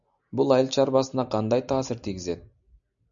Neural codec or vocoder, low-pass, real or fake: none; 7.2 kHz; real